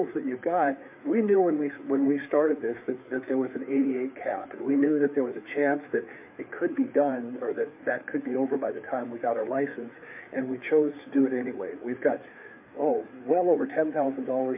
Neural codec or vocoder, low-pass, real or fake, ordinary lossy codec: codec, 16 kHz, 4 kbps, FreqCodec, larger model; 3.6 kHz; fake; MP3, 32 kbps